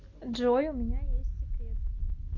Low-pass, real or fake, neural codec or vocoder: 7.2 kHz; real; none